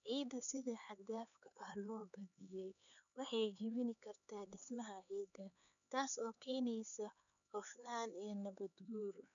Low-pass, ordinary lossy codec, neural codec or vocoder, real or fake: 7.2 kHz; none; codec, 16 kHz, 4 kbps, X-Codec, HuBERT features, trained on LibriSpeech; fake